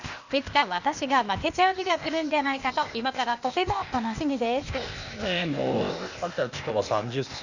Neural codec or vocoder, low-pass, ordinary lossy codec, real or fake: codec, 16 kHz, 0.8 kbps, ZipCodec; 7.2 kHz; none; fake